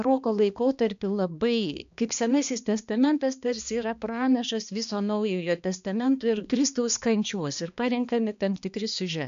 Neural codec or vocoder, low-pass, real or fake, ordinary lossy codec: codec, 16 kHz, 2 kbps, X-Codec, HuBERT features, trained on balanced general audio; 7.2 kHz; fake; MP3, 64 kbps